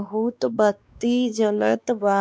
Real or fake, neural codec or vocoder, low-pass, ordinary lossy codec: fake; codec, 16 kHz, 2 kbps, X-Codec, WavLM features, trained on Multilingual LibriSpeech; none; none